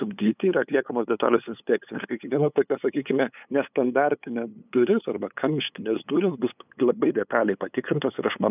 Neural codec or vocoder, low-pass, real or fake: codec, 16 kHz, 8 kbps, FunCodec, trained on LibriTTS, 25 frames a second; 3.6 kHz; fake